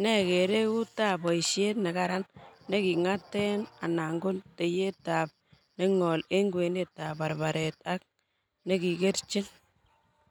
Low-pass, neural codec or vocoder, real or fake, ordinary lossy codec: 19.8 kHz; none; real; none